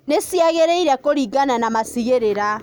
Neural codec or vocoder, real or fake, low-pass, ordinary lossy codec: none; real; none; none